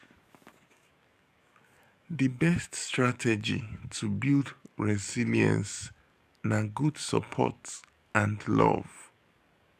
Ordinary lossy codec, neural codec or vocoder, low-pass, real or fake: none; codec, 44.1 kHz, 7.8 kbps, DAC; 14.4 kHz; fake